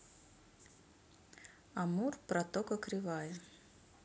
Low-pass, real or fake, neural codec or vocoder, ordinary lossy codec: none; real; none; none